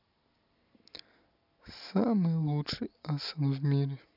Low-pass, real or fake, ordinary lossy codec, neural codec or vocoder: 5.4 kHz; real; none; none